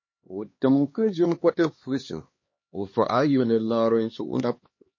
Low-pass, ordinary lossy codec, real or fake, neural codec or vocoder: 7.2 kHz; MP3, 32 kbps; fake; codec, 16 kHz, 2 kbps, X-Codec, HuBERT features, trained on LibriSpeech